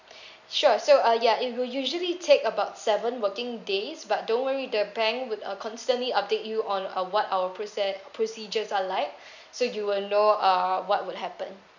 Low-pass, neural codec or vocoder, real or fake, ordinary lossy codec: 7.2 kHz; none; real; none